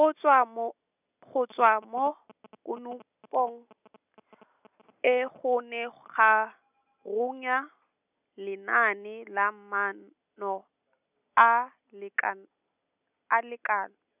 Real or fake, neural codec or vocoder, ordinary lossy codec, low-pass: real; none; none; 3.6 kHz